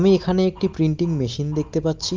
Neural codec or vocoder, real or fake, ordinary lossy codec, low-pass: none; real; Opus, 24 kbps; 7.2 kHz